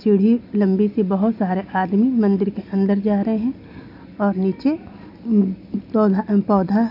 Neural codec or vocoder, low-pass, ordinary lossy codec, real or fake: none; 5.4 kHz; none; real